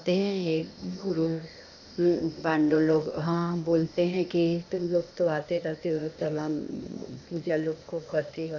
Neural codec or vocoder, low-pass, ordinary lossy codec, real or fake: codec, 16 kHz, 0.8 kbps, ZipCodec; 7.2 kHz; none; fake